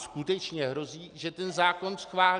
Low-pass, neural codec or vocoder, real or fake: 9.9 kHz; none; real